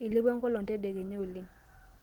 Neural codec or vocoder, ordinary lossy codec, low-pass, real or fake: none; Opus, 16 kbps; 19.8 kHz; real